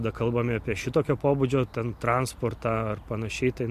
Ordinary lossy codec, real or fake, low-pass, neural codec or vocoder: MP3, 64 kbps; real; 14.4 kHz; none